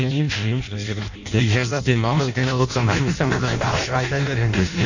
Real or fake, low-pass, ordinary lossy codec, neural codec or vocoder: fake; 7.2 kHz; none; codec, 16 kHz in and 24 kHz out, 0.6 kbps, FireRedTTS-2 codec